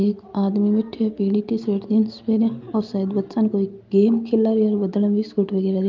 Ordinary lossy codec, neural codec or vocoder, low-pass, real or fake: Opus, 32 kbps; none; 7.2 kHz; real